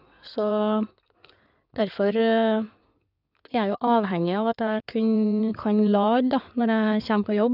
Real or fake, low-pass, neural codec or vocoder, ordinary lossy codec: fake; 5.4 kHz; codec, 16 kHz in and 24 kHz out, 2.2 kbps, FireRedTTS-2 codec; none